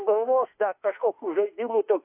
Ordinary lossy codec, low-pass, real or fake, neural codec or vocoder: AAC, 32 kbps; 3.6 kHz; fake; autoencoder, 48 kHz, 32 numbers a frame, DAC-VAE, trained on Japanese speech